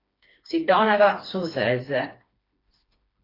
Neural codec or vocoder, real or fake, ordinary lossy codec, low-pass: codec, 16 kHz, 2 kbps, FreqCodec, smaller model; fake; AAC, 24 kbps; 5.4 kHz